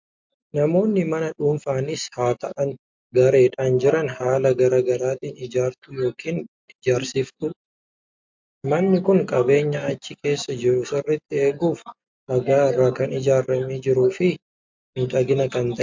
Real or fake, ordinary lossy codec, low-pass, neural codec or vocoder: real; MP3, 64 kbps; 7.2 kHz; none